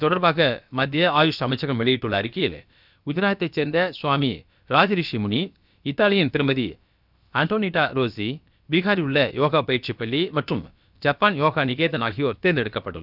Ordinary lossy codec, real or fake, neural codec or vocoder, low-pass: none; fake; codec, 16 kHz, about 1 kbps, DyCAST, with the encoder's durations; 5.4 kHz